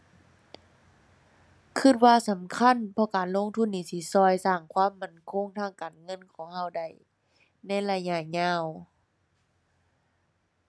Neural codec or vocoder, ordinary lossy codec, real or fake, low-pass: none; none; real; none